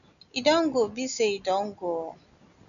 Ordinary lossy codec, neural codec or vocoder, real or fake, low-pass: none; none; real; 7.2 kHz